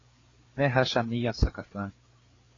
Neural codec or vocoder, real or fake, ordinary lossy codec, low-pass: codec, 16 kHz, 4 kbps, FreqCodec, larger model; fake; AAC, 32 kbps; 7.2 kHz